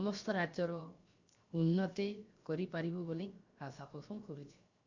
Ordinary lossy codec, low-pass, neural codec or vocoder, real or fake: Opus, 64 kbps; 7.2 kHz; codec, 16 kHz, 0.7 kbps, FocalCodec; fake